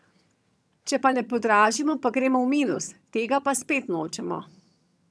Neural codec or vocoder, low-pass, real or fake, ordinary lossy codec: vocoder, 22.05 kHz, 80 mel bands, HiFi-GAN; none; fake; none